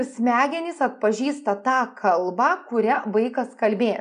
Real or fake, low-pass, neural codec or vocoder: real; 9.9 kHz; none